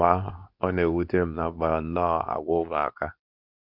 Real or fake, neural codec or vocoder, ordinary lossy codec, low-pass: fake; codec, 24 kHz, 0.9 kbps, WavTokenizer, medium speech release version 2; none; 5.4 kHz